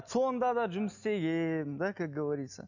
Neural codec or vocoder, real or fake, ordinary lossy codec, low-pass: none; real; none; 7.2 kHz